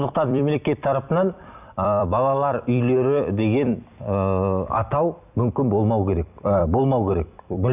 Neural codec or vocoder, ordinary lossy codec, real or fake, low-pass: vocoder, 44.1 kHz, 128 mel bands every 256 samples, BigVGAN v2; none; fake; 3.6 kHz